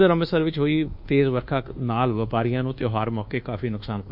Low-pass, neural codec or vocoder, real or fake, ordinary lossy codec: 5.4 kHz; codec, 24 kHz, 1.2 kbps, DualCodec; fake; AAC, 48 kbps